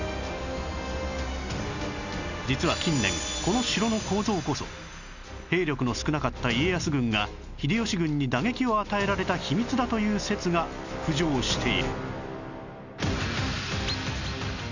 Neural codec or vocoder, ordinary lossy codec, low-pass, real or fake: none; none; 7.2 kHz; real